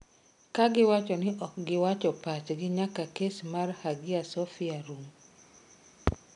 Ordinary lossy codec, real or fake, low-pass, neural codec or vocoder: none; real; 10.8 kHz; none